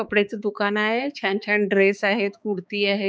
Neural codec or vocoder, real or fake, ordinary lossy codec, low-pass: codec, 16 kHz, 4 kbps, X-Codec, HuBERT features, trained on balanced general audio; fake; none; none